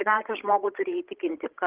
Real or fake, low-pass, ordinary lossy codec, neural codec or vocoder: fake; 3.6 kHz; Opus, 16 kbps; codec, 16 kHz, 16 kbps, FreqCodec, larger model